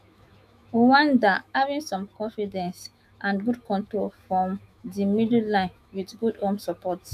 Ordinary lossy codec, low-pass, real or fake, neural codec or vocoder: none; 14.4 kHz; fake; autoencoder, 48 kHz, 128 numbers a frame, DAC-VAE, trained on Japanese speech